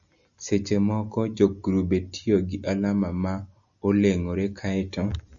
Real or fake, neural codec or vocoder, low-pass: real; none; 7.2 kHz